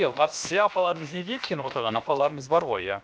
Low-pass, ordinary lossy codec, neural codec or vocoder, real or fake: none; none; codec, 16 kHz, about 1 kbps, DyCAST, with the encoder's durations; fake